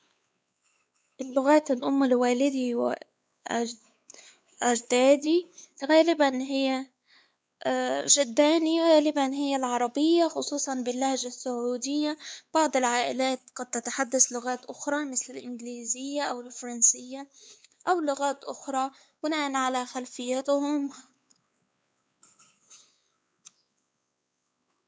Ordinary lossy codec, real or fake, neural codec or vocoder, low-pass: none; fake; codec, 16 kHz, 4 kbps, X-Codec, WavLM features, trained on Multilingual LibriSpeech; none